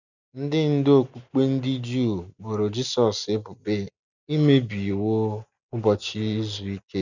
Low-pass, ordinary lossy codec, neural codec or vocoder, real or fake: 7.2 kHz; none; none; real